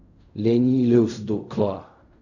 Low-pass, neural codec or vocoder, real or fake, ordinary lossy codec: 7.2 kHz; codec, 16 kHz in and 24 kHz out, 0.4 kbps, LongCat-Audio-Codec, fine tuned four codebook decoder; fake; none